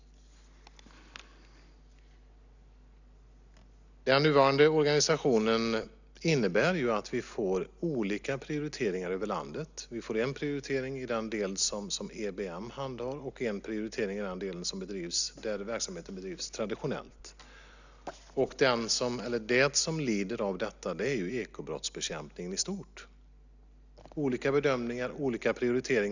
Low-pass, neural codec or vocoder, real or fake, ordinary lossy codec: 7.2 kHz; none; real; none